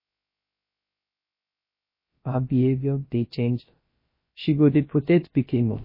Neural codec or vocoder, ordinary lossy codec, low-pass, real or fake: codec, 16 kHz, 0.3 kbps, FocalCodec; MP3, 24 kbps; 5.4 kHz; fake